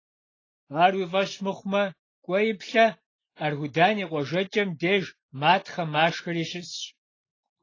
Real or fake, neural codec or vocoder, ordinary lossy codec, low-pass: real; none; AAC, 32 kbps; 7.2 kHz